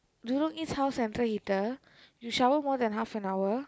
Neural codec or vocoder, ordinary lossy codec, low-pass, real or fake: none; none; none; real